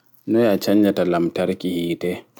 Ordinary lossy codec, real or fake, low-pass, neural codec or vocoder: none; real; 19.8 kHz; none